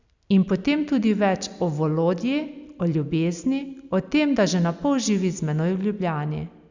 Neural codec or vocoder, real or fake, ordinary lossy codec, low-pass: none; real; Opus, 64 kbps; 7.2 kHz